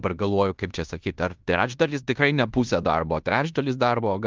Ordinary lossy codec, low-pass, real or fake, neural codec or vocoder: Opus, 32 kbps; 7.2 kHz; fake; codec, 16 kHz in and 24 kHz out, 0.9 kbps, LongCat-Audio-Codec, fine tuned four codebook decoder